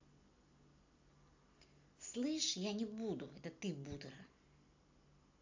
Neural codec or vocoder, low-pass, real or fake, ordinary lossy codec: none; 7.2 kHz; real; AAC, 48 kbps